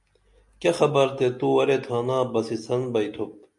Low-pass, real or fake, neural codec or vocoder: 10.8 kHz; real; none